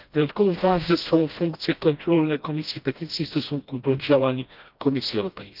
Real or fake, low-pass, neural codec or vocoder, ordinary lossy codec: fake; 5.4 kHz; codec, 16 kHz, 1 kbps, FreqCodec, smaller model; Opus, 24 kbps